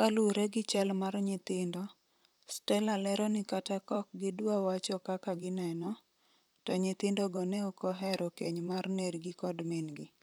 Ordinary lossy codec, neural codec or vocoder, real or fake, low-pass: none; vocoder, 44.1 kHz, 128 mel bands, Pupu-Vocoder; fake; none